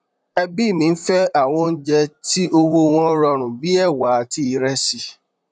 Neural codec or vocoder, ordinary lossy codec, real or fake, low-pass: vocoder, 44.1 kHz, 128 mel bands, Pupu-Vocoder; none; fake; 9.9 kHz